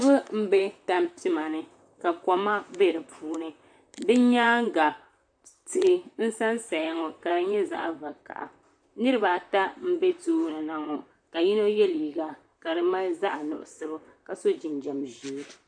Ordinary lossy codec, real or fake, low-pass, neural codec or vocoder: AAC, 64 kbps; fake; 9.9 kHz; vocoder, 44.1 kHz, 128 mel bands, Pupu-Vocoder